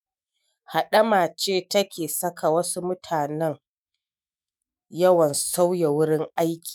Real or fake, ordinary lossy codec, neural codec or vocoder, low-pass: fake; none; autoencoder, 48 kHz, 128 numbers a frame, DAC-VAE, trained on Japanese speech; none